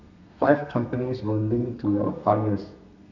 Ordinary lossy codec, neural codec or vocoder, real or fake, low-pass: none; codec, 32 kHz, 1.9 kbps, SNAC; fake; 7.2 kHz